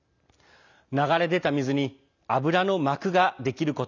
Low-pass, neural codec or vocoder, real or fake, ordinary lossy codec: 7.2 kHz; none; real; none